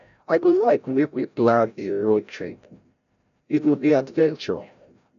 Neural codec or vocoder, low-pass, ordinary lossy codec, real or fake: codec, 16 kHz, 0.5 kbps, FreqCodec, larger model; 7.2 kHz; none; fake